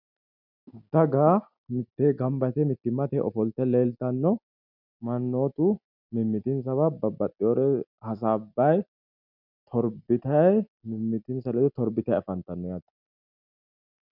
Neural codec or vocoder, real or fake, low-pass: none; real; 5.4 kHz